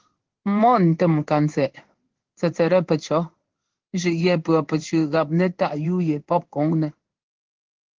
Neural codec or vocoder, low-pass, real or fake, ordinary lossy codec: codec, 16 kHz in and 24 kHz out, 1 kbps, XY-Tokenizer; 7.2 kHz; fake; Opus, 16 kbps